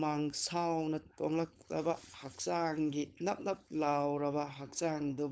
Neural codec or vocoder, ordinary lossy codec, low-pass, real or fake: codec, 16 kHz, 4.8 kbps, FACodec; none; none; fake